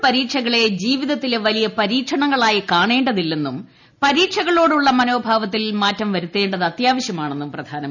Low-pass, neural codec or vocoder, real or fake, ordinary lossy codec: 7.2 kHz; none; real; none